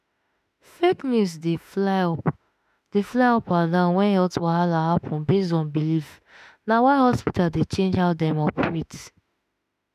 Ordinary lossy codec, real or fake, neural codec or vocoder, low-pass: none; fake; autoencoder, 48 kHz, 32 numbers a frame, DAC-VAE, trained on Japanese speech; 14.4 kHz